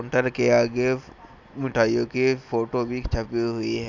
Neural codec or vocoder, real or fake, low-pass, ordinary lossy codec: none; real; 7.2 kHz; none